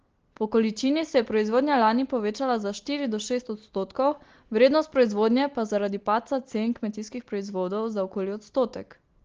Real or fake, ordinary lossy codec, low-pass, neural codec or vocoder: real; Opus, 16 kbps; 7.2 kHz; none